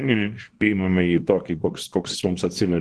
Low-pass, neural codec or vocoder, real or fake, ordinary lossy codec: 10.8 kHz; codec, 24 kHz, 0.9 kbps, WavTokenizer, small release; fake; Opus, 16 kbps